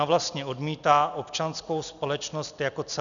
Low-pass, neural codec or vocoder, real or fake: 7.2 kHz; none; real